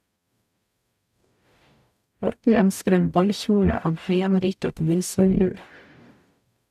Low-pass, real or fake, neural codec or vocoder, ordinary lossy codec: 14.4 kHz; fake; codec, 44.1 kHz, 0.9 kbps, DAC; none